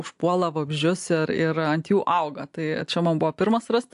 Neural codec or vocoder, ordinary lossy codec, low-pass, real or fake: none; AAC, 64 kbps; 10.8 kHz; real